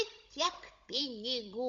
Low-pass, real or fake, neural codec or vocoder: 7.2 kHz; fake; codec, 16 kHz, 16 kbps, FunCodec, trained on Chinese and English, 50 frames a second